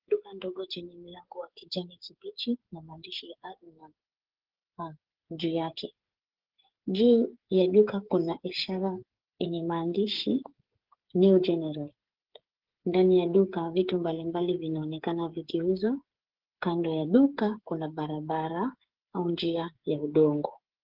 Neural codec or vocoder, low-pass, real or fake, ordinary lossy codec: codec, 16 kHz, 8 kbps, FreqCodec, smaller model; 5.4 kHz; fake; Opus, 16 kbps